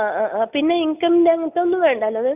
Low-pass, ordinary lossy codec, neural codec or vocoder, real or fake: 3.6 kHz; none; none; real